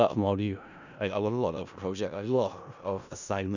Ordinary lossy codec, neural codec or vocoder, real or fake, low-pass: none; codec, 16 kHz in and 24 kHz out, 0.4 kbps, LongCat-Audio-Codec, four codebook decoder; fake; 7.2 kHz